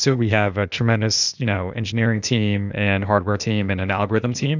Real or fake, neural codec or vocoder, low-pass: fake; codec, 16 kHz, 0.8 kbps, ZipCodec; 7.2 kHz